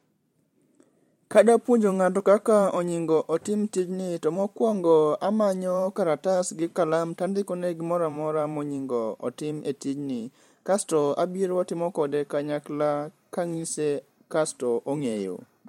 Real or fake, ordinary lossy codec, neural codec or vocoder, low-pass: fake; MP3, 64 kbps; vocoder, 44.1 kHz, 128 mel bands every 512 samples, BigVGAN v2; 19.8 kHz